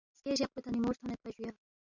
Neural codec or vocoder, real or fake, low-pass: none; real; 7.2 kHz